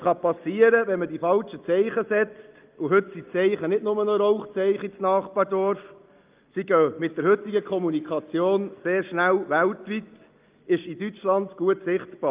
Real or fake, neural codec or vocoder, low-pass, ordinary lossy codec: real; none; 3.6 kHz; Opus, 32 kbps